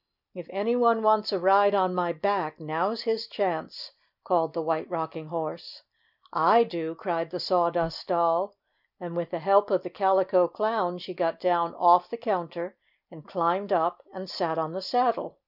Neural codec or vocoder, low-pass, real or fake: none; 5.4 kHz; real